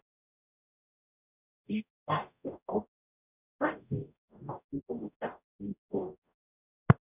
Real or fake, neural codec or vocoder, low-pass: fake; codec, 44.1 kHz, 0.9 kbps, DAC; 3.6 kHz